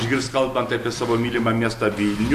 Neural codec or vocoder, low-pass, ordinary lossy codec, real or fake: none; 14.4 kHz; MP3, 64 kbps; real